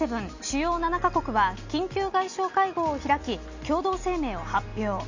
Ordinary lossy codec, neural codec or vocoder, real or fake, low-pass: Opus, 64 kbps; none; real; 7.2 kHz